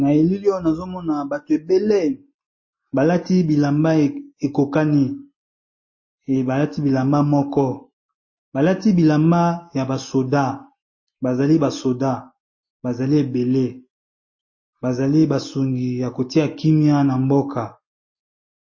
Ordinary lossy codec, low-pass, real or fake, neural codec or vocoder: MP3, 32 kbps; 7.2 kHz; real; none